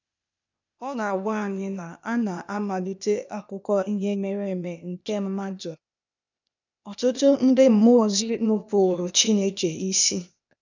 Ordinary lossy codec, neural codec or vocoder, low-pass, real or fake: none; codec, 16 kHz, 0.8 kbps, ZipCodec; 7.2 kHz; fake